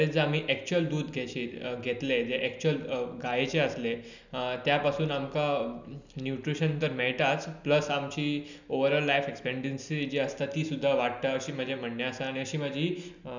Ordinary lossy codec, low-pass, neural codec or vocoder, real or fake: none; 7.2 kHz; none; real